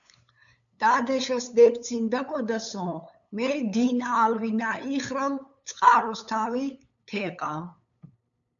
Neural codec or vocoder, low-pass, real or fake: codec, 16 kHz, 8 kbps, FunCodec, trained on LibriTTS, 25 frames a second; 7.2 kHz; fake